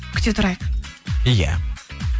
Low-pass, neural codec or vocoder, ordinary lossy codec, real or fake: none; none; none; real